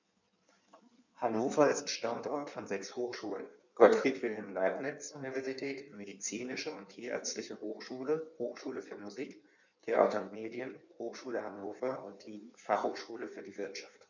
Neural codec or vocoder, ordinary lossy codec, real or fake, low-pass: codec, 16 kHz in and 24 kHz out, 1.1 kbps, FireRedTTS-2 codec; none; fake; 7.2 kHz